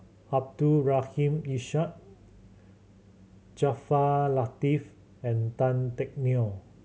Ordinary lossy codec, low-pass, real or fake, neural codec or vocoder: none; none; real; none